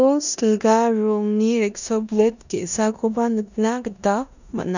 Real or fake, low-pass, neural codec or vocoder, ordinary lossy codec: fake; 7.2 kHz; codec, 16 kHz in and 24 kHz out, 0.9 kbps, LongCat-Audio-Codec, four codebook decoder; none